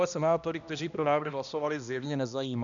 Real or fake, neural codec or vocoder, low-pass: fake; codec, 16 kHz, 1 kbps, X-Codec, HuBERT features, trained on balanced general audio; 7.2 kHz